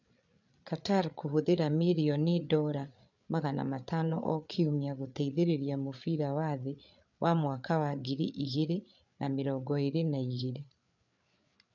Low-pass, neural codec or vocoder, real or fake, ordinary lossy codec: 7.2 kHz; codec, 16 kHz, 8 kbps, FreqCodec, larger model; fake; none